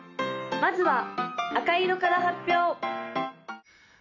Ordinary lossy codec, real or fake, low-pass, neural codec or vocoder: none; real; 7.2 kHz; none